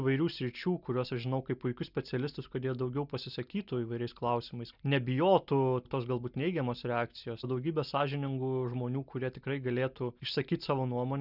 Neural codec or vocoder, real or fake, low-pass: none; real; 5.4 kHz